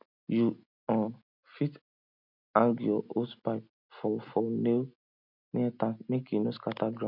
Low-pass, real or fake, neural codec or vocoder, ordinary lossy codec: 5.4 kHz; real; none; none